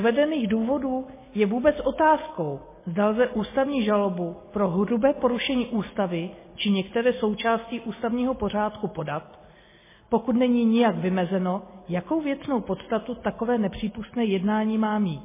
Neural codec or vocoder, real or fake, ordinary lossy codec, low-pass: none; real; MP3, 16 kbps; 3.6 kHz